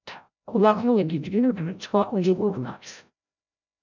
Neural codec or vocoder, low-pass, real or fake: codec, 16 kHz, 0.5 kbps, FreqCodec, larger model; 7.2 kHz; fake